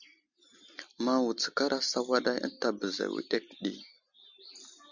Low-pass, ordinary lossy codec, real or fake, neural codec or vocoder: 7.2 kHz; Opus, 64 kbps; real; none